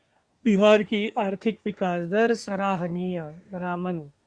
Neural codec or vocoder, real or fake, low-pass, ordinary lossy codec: codec, 24 kHz, 1 kbps, SNAC; fake; 9.9 kHz; Opus, 64 kbps